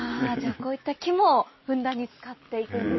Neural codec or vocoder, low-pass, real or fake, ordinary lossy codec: none; 7.2 kHz; real; MP3, 24 kbps